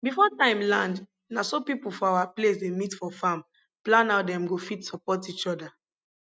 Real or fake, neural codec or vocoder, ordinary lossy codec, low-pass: real; none; none; none